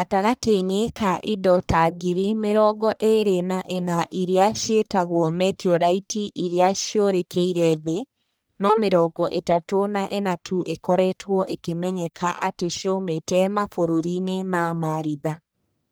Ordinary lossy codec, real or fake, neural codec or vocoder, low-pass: none; fake; codec, 44.1 kHz, 1.7 kbps, Pupu-Codec; none